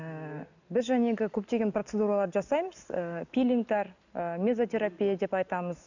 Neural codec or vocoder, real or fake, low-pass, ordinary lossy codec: none; real; 7.2 kHz; none